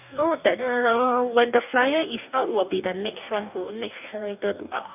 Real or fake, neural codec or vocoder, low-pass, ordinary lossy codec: fake; codec, 44.1 kHz, 2.6 kbps, DAC; 3.6 kHz; none